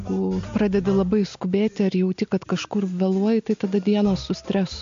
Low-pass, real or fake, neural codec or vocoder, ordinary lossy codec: 7.2 kHz; real; none; MP3, 48 kbps